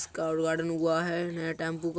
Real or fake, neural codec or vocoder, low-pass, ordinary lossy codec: real; none; none; none